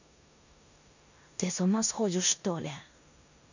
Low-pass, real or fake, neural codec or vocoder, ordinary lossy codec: 7.2 kHz; fake; codec, 16 kHz in and 24 kHz out, 0.9 kbps, LongCat-Audio-Codec, four codebook decoder; none